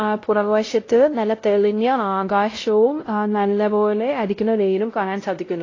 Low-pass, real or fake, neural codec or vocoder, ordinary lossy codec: 7.2 kHz; fake; codec, 16 kHz, 0.5 kbps, X-Codec, WavLM features, trained on Multilingual LibriSpeech; AAC, 32 kbps